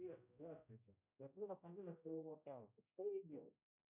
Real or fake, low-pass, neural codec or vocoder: fake; 3.6 kHz; codec, 16 kHz, 0.5 kbps, X-Codec, HuBERT features, trained on general audio